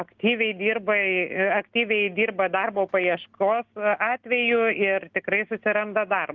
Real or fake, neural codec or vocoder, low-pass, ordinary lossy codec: real; none; 7.2 kHz; Opus, 32 kbps